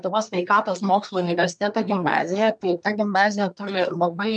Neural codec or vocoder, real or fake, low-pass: codec, 24 kHz, 1 kbps, SNAC; fake; 9.9 kHz